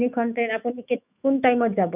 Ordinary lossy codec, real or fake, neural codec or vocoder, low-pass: none; fake; autoencoder, 48 kHz, 128 numbers a frame, DAC-VAE, trained on Japanese speech; 3.6 kHz